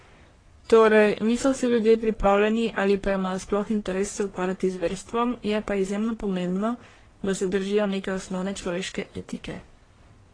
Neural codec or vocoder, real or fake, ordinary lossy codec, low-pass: codec, 44.1 kHz, 1.7 kbps, Pupu-Codec; fake; AAC, 32 kbps; 9.9 kHz